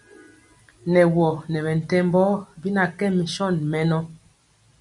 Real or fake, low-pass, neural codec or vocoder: real; 10.8 kHz; none